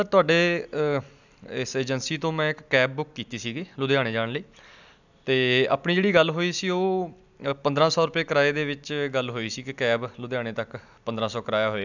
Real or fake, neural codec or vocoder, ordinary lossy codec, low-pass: real; none; none; 7.2 kHz